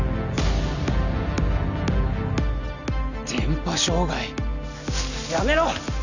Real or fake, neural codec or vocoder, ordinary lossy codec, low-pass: real; none; none; 7.2 kHz